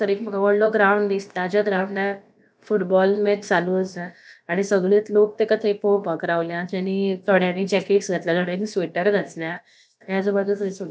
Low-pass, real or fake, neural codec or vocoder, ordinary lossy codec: none; fake; codec, 16 kHz, about 1 kbps, DyCAST, with the encoder's durations; none